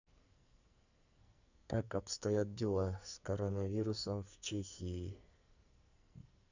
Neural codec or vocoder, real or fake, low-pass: codec, 44.1 kHz, 2.6 kbps, SNAC; fake; 7.2 kHz